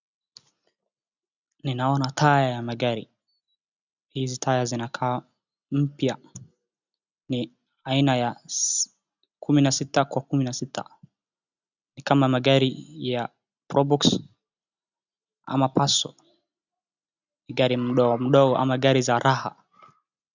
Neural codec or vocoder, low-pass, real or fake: none; 7.2 kHz; real